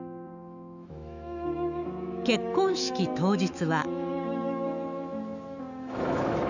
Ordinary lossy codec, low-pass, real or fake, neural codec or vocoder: none; 7.2 kHz; fake; autoencoder, 48 kHz, 128 numbers a frame, DAC-VAE, trained on Japanese speech